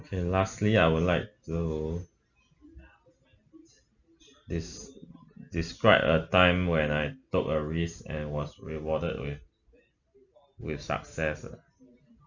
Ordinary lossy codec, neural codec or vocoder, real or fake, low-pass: none; none; real; 7.2 kHz